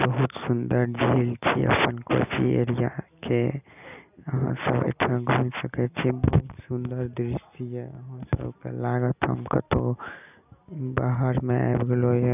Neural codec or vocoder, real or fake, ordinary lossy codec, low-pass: none; real; none; 3.6 kHz